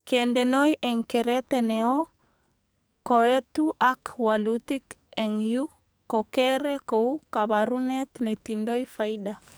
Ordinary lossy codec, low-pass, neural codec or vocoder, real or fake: none; none; codec, 44.1 kHz, 2.6 kbps, SNAC; fake